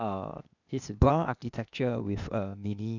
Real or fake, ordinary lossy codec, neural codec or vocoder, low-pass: fake; none; codec, 16 kHz, 0.8 kbps, ZipCodec; 7.2 kHz